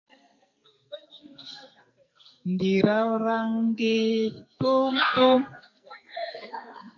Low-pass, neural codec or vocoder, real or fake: 7.2 kHz; codec, 44.1 kHz, 2.6 kbps, SNAC; fake